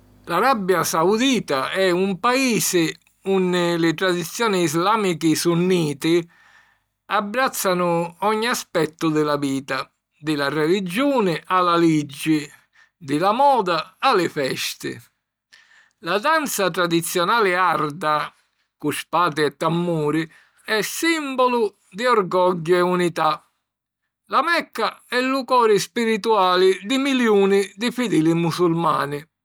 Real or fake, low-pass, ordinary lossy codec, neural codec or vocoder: real; none; none; none